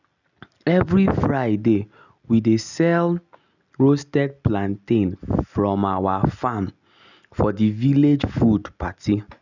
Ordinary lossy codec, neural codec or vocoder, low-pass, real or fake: none; none; 7.2 kHz; real